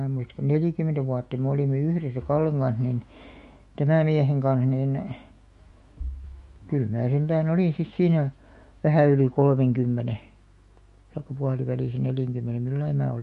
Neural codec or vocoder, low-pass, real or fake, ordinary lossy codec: autoencoder, 48 kHz, 128 numbers a frame, DAC-VAE, trained on Japanese speech; 14.4 kHz; fake; MP3, 48 kbps